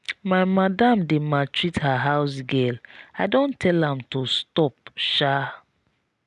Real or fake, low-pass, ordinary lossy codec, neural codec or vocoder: real; none; none; none